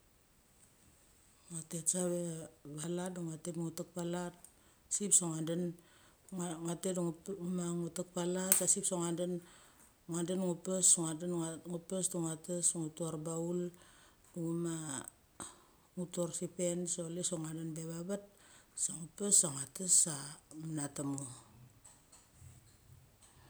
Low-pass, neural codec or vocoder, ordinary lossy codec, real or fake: none; none; none; real